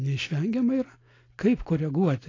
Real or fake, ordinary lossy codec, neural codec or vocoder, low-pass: real; AAC, 32 kbps; none; 7.2 kHz